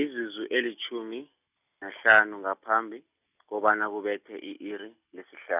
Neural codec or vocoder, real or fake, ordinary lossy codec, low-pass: none; real; none; 3.6 kHz